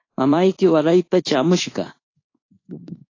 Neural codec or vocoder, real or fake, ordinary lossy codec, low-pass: codec, 24 kHz, 1.2 kbps, DualCodec; fake; AAC, 32 kbps; 7.2 kHz